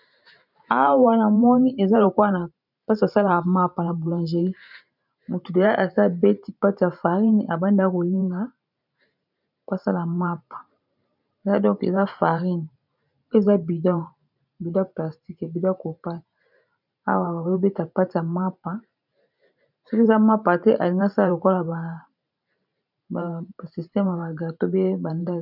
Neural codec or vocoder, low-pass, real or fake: vocoder, 44.1 kHz, 128 mel bands every 512 samples, BigVGAN v2; 5.4 kHz; fake